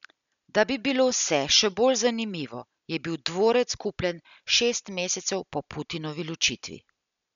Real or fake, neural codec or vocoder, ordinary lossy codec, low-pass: real; none; none; 7.2 kHz